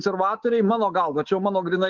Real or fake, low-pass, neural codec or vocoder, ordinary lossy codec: fake; 7.2 kHz; codec, 24 kHz, 3.1 kbps, DualCodec; Opus, 16 kbps